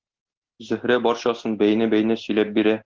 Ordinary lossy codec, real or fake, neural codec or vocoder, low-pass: Opus, 16 kbps; real; none; 7.2 kHz